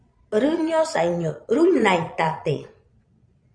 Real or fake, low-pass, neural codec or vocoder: fake; 9.9 kHz; vocoder, 22.05 kHz, 80 mel bands, Vocos